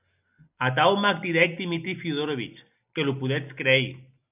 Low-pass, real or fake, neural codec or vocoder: 3.6 kHz; real; none